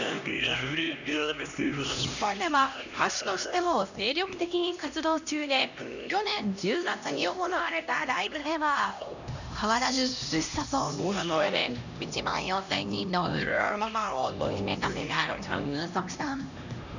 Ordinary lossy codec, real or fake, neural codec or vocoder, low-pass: none; fake; codec, 16 kHz, 1 kbps, X-Codec, HuBERT features, trained on LibriSpeech; 7.2 kHz